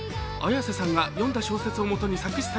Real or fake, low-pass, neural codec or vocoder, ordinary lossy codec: real; none; none; none